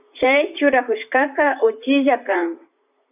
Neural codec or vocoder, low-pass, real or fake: vocoder, 44.1 kHz, 128 mel bands, Pupu-Vocoder; 3.6 kHz; fake